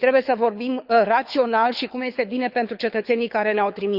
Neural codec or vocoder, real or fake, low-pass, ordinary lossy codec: codec, 24 kHz, 6 kbps, HILCodec; fake; 5.4 kHz; none